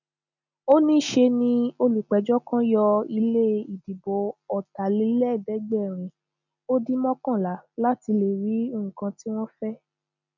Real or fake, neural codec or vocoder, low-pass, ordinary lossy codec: real; none; 7.2 kHz; none